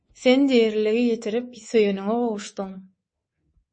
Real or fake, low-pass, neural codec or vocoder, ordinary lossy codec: fake; 9.9 kHz; codec, 24 kHz, 3.1 kbps, DualCodec; MP3, 32 kbps